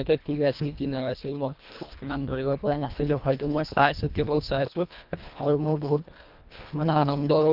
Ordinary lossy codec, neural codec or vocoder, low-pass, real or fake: Opus, 24 kbps; codec, 24 kHz, 1.5 kbps, HILCodec; 5.4 kHz; fake